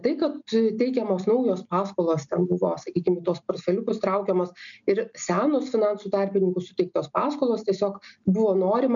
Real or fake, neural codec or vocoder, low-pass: real; none; 7.2 kHz